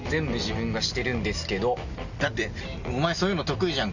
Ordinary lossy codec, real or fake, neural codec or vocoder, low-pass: none; real; none; 7.2 kHz